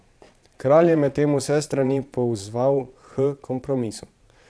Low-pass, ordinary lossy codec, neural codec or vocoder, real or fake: none; none; vocoder, 22.05 kHz, 80 mel bands, Vocos; fake